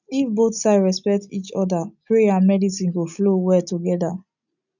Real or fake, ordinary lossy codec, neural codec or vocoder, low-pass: real; none; none; 7.2 kHz